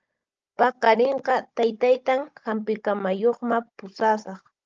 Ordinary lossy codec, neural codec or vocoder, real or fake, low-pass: Opus, 24 kbps; codec, 16 kHz, 16 kbps, FunCodec, trained on Chinese and English, 50 frames a second; fake; 7.2 kHz